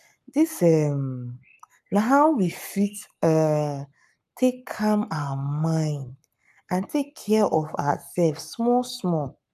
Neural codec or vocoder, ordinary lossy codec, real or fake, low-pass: codec, 44.1 kHz, 7.8 kbps, Pupu-Codec; none; fake; 14.4 kHz